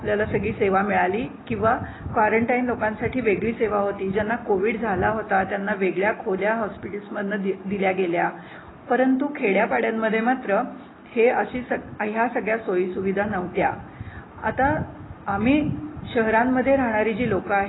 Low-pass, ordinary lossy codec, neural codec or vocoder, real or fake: 7.2 kHz; AAC, 16 kbps; none; real